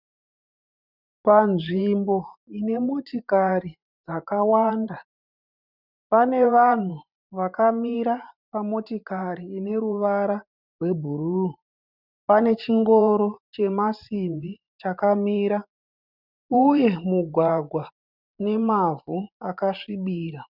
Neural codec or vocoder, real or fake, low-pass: vocoder, 44.1 kHz, 128 mel bands every 512 samples, BigVGAN v2; fake; 5.4 kHz